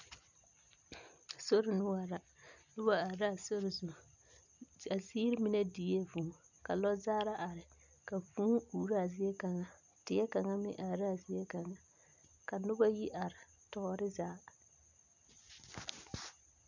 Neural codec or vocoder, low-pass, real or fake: none; 7.2 kHz; real